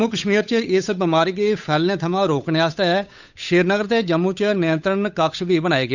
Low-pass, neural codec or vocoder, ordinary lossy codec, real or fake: 7.2 kHz; codec, 16 kHz, 8 kbps, FunCodec, trained on Chinese and English, 25 frames a second; none; fake